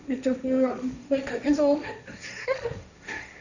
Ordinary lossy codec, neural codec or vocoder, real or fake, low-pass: none; codec, 16 kHz, 1.1 kbps, Voila-Tokenizer; fake; 7.2 kHz